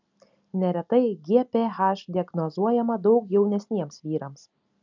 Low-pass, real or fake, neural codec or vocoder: 7.2 kHz; real; none